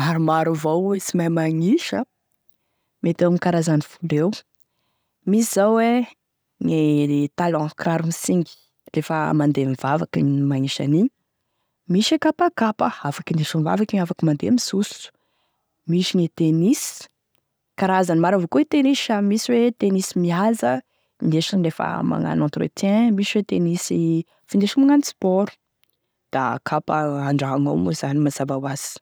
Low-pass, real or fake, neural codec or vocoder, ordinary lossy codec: none; real; none; none